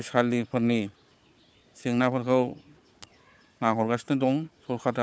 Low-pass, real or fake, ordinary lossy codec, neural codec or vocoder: none; fake; none; codec, 16 kHz, 4 kbps, FunCodec, trained on Chinese and English, 50 frames a second